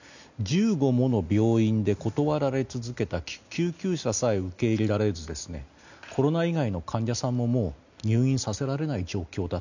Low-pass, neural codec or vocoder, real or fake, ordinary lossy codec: 7.2 kHz; none; real; none